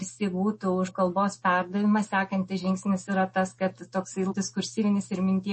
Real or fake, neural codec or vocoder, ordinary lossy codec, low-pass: real; none; MP3, 32 kbps; 10.8 kHz